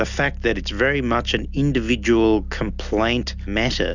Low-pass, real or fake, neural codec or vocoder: 7.2 kHz; real; none